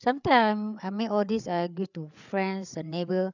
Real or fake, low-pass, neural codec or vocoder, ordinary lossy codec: fake; 7.2 kHz; codec, 16 kHz, 8 kbps, FreqCodec, larger model; none